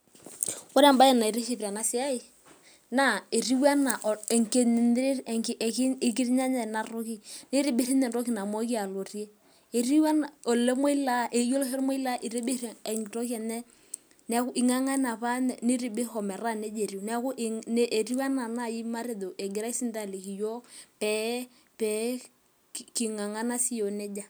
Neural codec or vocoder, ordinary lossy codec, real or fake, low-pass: none; none; real; none